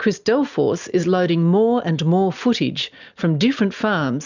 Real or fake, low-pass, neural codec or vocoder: real; 7.2 kHz; none